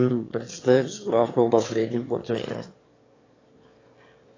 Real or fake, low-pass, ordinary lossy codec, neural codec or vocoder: fake; 7.2 kHz; AAC, 32 kbps; autoencoder, 22.05 kHz, a latent of 192 numbers a frame, VITS, trained on one speaker